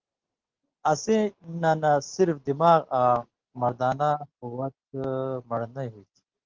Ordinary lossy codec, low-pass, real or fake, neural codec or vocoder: Opus, 16 kbps; 7.2 kHz; real; none